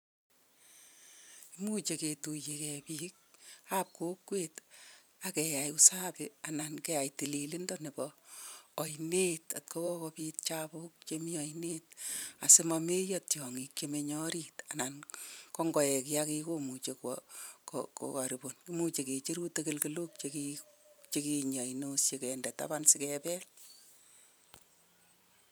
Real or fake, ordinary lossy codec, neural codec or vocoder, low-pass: fake; none; vocoder, 44.1 kHz, 128 mel bands every 512 samples, BigVGAN v2; none